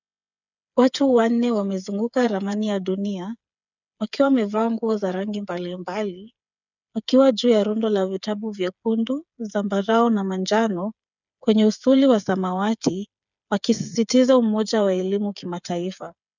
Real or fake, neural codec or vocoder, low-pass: fake; codec, 16 kHz, 8 kbps, FreqCodec, smaller model; 7.2 kHz